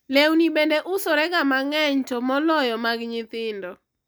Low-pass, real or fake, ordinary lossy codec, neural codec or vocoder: none; real; none; none